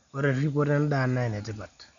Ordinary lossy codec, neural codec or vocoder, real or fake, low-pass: none; none; real; 7.2 kHz